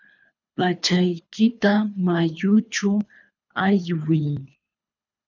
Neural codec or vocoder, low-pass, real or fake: codec, 24 kHz, 3 kbps, HILCodec; 7.2 kHz; fake